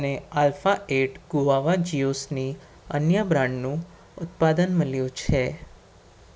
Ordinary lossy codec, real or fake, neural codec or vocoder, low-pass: none; real; none; none